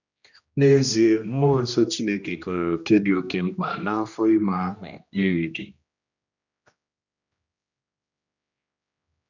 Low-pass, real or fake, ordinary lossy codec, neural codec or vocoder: 7.2 kHz; fake; none; codec, 16 kHz, 1 kbps, X-Codec, HuBERT features, trained on general audio